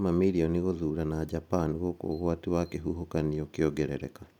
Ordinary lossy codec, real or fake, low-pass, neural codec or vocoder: none; real; 19.8 kHz; none